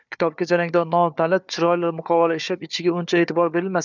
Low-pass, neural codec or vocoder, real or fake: 7.2 kHz; codec, 16 kHz, 4 kbps, FunCodec, trained on Chinese and English, 50 frames a second; fake